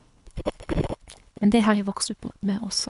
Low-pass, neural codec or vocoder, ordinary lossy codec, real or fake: 10.8 kHz; codec, 24 kHz, 3 kbps, HILCodec; none; fake